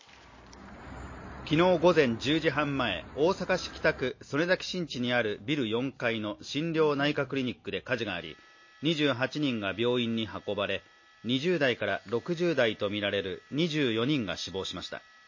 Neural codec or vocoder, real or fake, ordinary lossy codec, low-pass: none; real; MP3, 32 kbps; 7.2 kHz